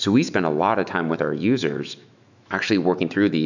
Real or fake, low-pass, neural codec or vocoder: fake; 7.2 kHz; autoencoder, 48 kHz, 128 numbers a frame, DAC-VAE, trained on Japanese speech